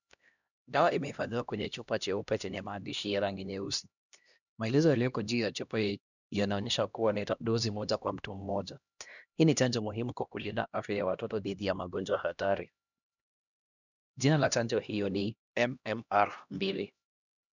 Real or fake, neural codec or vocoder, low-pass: fake; codec, 16 kHz, 1 kbps, X-Codec, HuBERT features, trained on LibriSpeech; 7.2 kHz